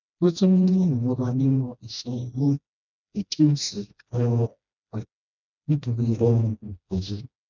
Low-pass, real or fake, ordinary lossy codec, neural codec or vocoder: 7.2 kHz; fake; none; codec, 16 kHz, 1 kbps, FreqCodec, smaller model